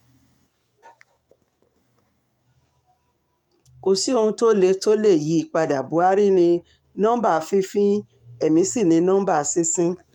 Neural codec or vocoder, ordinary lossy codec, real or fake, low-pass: codec, 44.1 kHz, 7.8 kbps, DAC; MP3, 96 kbps; fake; 19.8 kHz